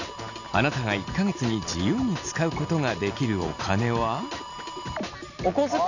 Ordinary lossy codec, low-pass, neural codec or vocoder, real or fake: none; 7.2 kHz; none; real